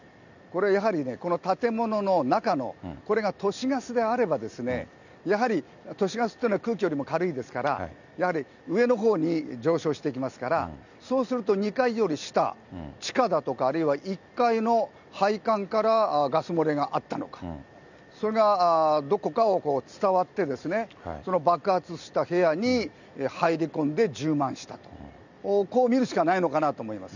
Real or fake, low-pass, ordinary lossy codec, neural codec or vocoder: real; 7.2 kHz; none; none